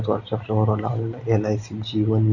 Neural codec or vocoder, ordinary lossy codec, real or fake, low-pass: none; none; real; 7.2 kHz